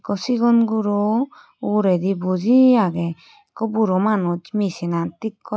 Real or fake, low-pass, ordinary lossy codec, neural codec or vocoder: real; none; none; none